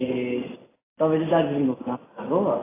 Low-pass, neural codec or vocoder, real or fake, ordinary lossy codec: 3.6 kHz; none; real; AAC, 16 kbps